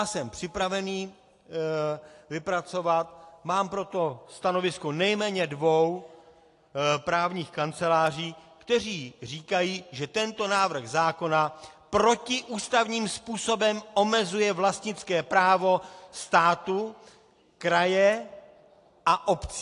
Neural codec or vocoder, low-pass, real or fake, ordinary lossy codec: none; 10.8 kHz; real; AAC, 48 kbps